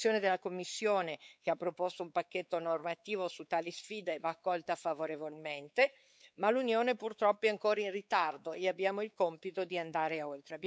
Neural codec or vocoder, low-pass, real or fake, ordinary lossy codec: codec, 16 kHz, 4 kbps, X-Codec, WavLM features, trained on Multilingual LibriSpeech; none; fake; none